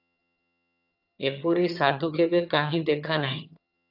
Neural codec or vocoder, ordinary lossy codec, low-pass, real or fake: vocoder, 22.05 kHz, 80 mel bands, HiFi-GAN; AAC, 48 kbps; 5.4 kHz; fake